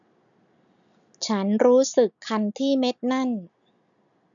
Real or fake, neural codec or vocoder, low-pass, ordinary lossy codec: real; none; 7.2 kHz; none